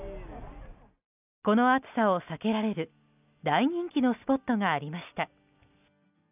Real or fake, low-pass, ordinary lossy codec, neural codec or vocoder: real; 3.6 kHz; none; none